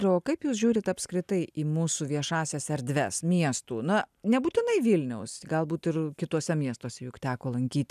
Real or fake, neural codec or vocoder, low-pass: real; none; 14.4 kHz